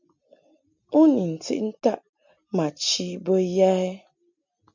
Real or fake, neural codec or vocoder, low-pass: real; none; 7.2 kHz